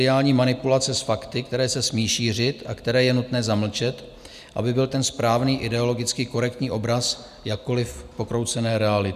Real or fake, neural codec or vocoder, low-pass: real; none; 14.4 kHz